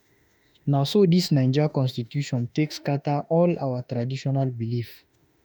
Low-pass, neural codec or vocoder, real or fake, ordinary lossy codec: none; autoencoder, 48 kHz, 32 numbers a frame, DAC-VAE, trained on Japanese speech; fake; none